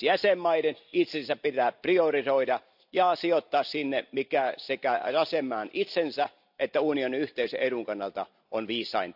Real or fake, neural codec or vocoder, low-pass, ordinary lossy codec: real; none; 5.4 kHz; AAC, 48 kbps